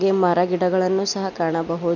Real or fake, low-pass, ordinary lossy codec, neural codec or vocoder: real; 7.2 kHz; none; none